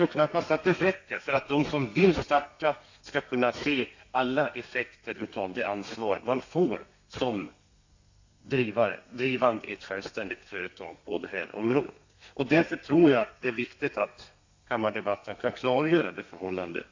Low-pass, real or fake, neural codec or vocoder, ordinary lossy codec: 7.2 kHz; fake; codec, 32 kHz, 1.9 kbps, SNAC; AAC, 48 kbps